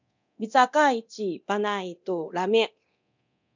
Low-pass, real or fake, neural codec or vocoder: 7.2 kHz; fake; codec, 24 kHz, 0.9 kbps, DualCodec